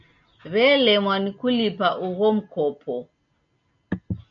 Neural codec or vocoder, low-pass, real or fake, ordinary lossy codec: none; 7.2 kHz; real; MP3, 48 kbps